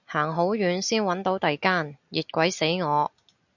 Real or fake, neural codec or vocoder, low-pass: real; none; 7.2 kHz